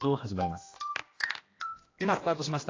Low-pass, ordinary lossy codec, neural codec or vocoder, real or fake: 7.2 kHz; AAC, 32 kbps; codec, 16 kHz, 1 kbps, X-Codec, HuBERT features, trained on general audio; fake